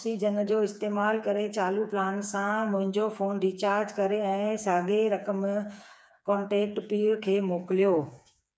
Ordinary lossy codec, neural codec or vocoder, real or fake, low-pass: none; codec, 16 kHz, 4 kbps, FreqCodec, smaller model; fake; none